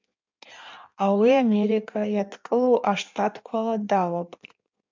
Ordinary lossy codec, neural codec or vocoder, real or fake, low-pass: MP3, 64 kbps; codec, 16 kHz in and 24 kHz out, 1.1 kbps, FireRedTTS-2 codec; fake; 7.2 kHz